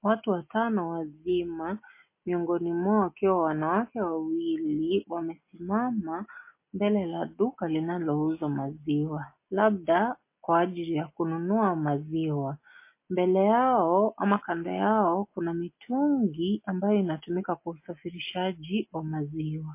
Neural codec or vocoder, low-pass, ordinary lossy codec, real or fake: none; 3.6 kHz; MP3, 24 kbps; real